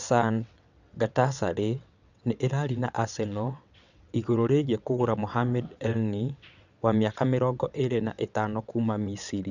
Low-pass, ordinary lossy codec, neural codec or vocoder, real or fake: 7.2 kHz; none; vocoder, 22.05 kHz, 80 mel bands, WaveNeXt; fake